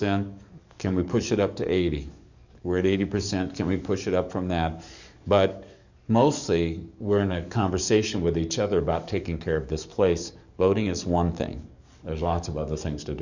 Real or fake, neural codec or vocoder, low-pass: fake; codec, 44.1 kHz, 7.8 kbps, DAC; 7.2 kHz